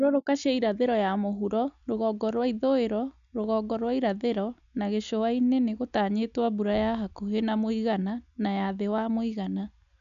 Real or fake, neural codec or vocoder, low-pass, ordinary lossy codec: real; none; 7.2 kHz; none